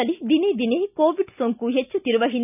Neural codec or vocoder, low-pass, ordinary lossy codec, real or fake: none; 3.6 kHz; none; real